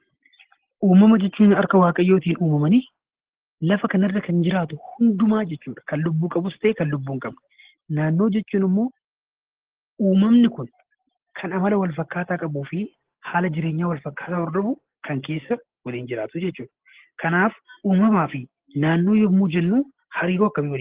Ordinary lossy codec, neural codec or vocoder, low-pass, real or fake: Opus, 16 kbps; none; 3.6 kHz; real